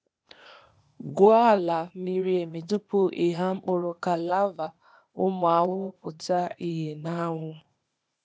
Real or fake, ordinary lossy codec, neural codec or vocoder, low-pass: fake; none; codec, 16 kHz, 0.8 kbps, ZipCodec; none